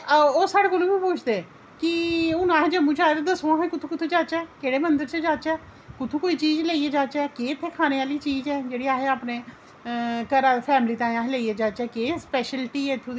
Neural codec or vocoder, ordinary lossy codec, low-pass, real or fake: none; none; none; real